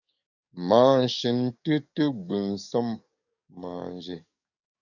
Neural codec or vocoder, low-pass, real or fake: codec, 44.1 kHz, 7.8 kbps, DAC; 7.2 kHz; fake